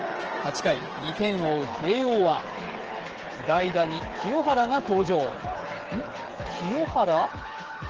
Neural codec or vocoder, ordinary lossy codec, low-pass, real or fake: codec, 16 kHz, 8 kbps, FreqCodec, smaller model; Opus, 16 kbps; 7.2 kHz; fake